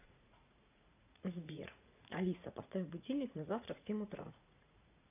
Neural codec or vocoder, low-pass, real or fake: vocoder, 44.1 kHz, 80 mel bands, Vocos; 3.6 kHz; fake